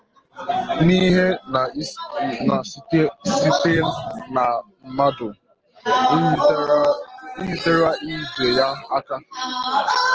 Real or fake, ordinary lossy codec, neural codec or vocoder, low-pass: real; Opus, 16 kbps; none; 7.2 kHz